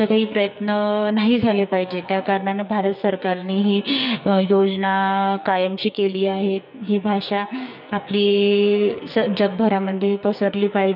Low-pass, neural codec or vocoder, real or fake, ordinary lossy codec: 5.4 kHz; codec, 44.1 kHz, 2.6 kbps, SNAC; fake; none